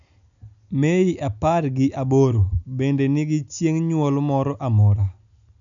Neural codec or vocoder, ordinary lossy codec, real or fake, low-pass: none; none; real; 7.2 kHz